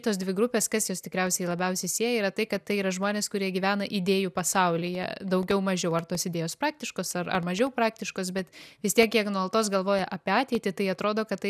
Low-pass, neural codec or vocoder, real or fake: 14.4 kHz; none; real